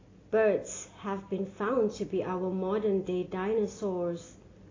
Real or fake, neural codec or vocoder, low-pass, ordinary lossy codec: real; none; 7.2 kHz; AAC, 32 kbps